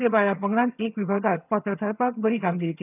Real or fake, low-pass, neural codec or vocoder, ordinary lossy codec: fake; 3.6 kHz; vocoder, 22.05 kHz, 80 mel bands, HiFi-GAN; none